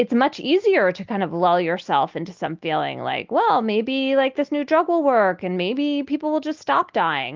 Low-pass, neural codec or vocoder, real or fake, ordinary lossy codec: 7.2 kHz; none; real; Opus, 32 kbps